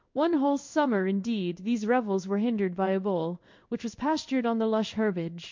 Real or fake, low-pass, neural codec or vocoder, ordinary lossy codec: fake; 7.2 kHz; codec, 16 kHz in and 24 kHz out, 1 kbps, XY-Tokenizer; MP3, 48 kbps